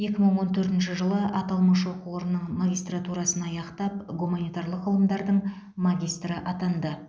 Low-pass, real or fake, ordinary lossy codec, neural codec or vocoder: none; real; none; none